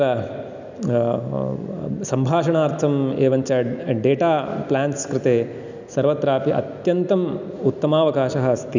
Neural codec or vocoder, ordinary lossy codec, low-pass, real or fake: none; none; 7.2 kHz; real